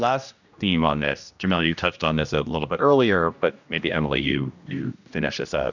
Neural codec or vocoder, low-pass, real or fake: codec, 16 kHz, 1 kbps, X-Codec, HuBERT features, trained on general audio; 7.2 kHz; fake